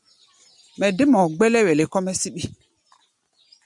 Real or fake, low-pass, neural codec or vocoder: real; 10.8 kHz; none